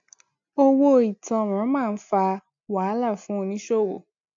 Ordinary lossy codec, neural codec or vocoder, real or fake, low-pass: MP3, 48 kbps; none; real; 7.2 kHz